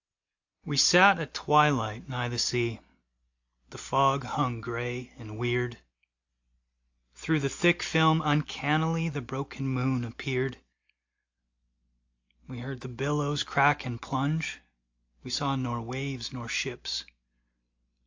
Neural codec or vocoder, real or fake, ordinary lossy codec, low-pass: none; real; AAC, 48 kbps; 7.2 kHz